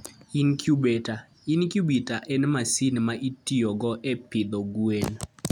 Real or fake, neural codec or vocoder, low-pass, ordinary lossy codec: real; none; 19.8 kHz; none